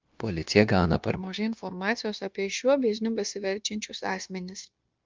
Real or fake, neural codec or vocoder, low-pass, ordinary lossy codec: fake; codec, 16 kHz, 0.9 kbps, LongCat-Audio-Codec; 7.2 kHz; Opus, 24 kbps